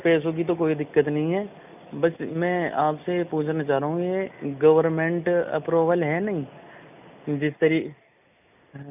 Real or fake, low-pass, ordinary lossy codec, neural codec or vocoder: real; 3.6 kHz; none; none